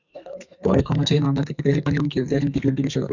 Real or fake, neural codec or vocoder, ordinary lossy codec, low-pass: fake; codec, 44.1 kHz, 2.6 kbps, SNAC; Opus, 64 kbps; 7.2 kHz